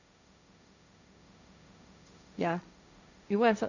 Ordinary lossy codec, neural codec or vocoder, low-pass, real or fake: none; codec, 16 kHz, 1.1 kbps, Voila-Tokenizer; 7.2 kHz; fake